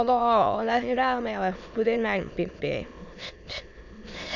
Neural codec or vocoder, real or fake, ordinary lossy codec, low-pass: autoencoder, 22.05 kHz, a latent of 192 numbers a frame, VITS, trained on many speakers; fake; none; 7.2 kHz